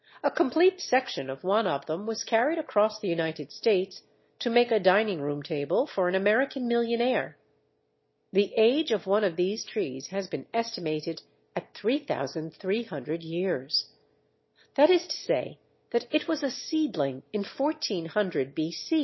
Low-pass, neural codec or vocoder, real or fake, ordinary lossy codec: 7.2 kHz; none; real; MP3, 24 kbps